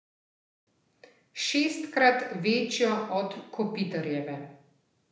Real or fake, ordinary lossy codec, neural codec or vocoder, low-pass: real; none; none; none